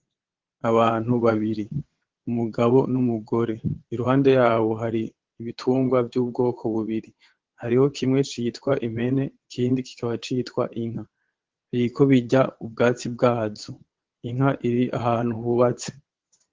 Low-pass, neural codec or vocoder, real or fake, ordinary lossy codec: 7.2 kHz; vocoder, 22.05 kHz, 80 mel bands, WaveNeXt; fake; Opus, 16 kbps